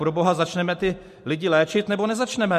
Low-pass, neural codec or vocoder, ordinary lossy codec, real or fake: 14.4 kHz; none; MP3, 64 kbps; real